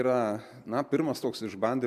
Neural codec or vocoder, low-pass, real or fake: none; 14.4 kHz; real